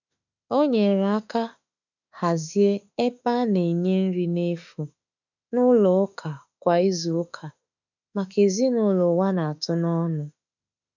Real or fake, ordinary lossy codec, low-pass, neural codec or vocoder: fake; none; 7.2 kHz; autoencoder, 48 kHz, 32 numbers a frame, DAC-VAE, trained on Japanese speech